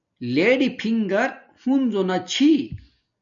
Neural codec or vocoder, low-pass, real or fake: none; 7.2 kHz; real